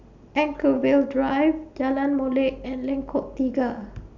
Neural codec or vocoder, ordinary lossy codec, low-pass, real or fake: none; none; 7.2 kHz; real